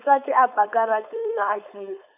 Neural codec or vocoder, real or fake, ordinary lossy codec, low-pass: codec, 16 kHz, 4.8 kbps, FACodec; fake; none; 3.6 kHz